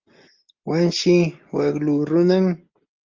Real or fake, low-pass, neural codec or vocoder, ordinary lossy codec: real; 7.2 kHz; none; Opus, 24 kbps